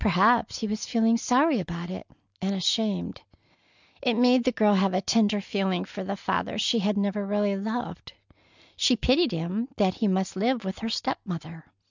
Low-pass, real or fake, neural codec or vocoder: 7.2 kHz; real; none